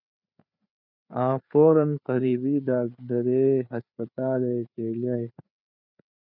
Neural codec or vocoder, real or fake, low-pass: codec, 16 kHz, 4 kbps, FreqCodec, larger model; fake; 5.4 kHz